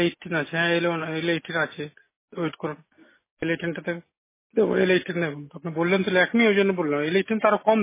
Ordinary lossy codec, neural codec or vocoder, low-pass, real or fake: MP3, 16 kbps; none; 3.6 kHz; real